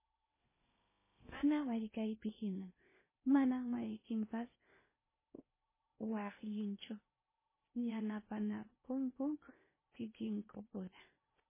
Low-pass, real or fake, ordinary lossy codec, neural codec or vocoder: 3.6 kHz; fake; MP3, 16 kbps; codec, 16 kHz in and 24 kHz out, 0.8 kbps, FocalCodec, streaming, 65536 codes